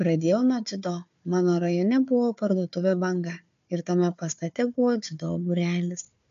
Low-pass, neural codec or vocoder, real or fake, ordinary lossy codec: 7.2 kHz; codec, 16 kHz, 4 kbps, FunCodec, trained on Chinese and English, 50 frames a second; fake; AAC, 64 kbps